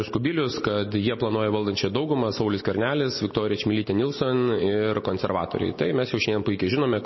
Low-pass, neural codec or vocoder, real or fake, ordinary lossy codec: 7.2 kHz; none; real; MP3, 24 kbps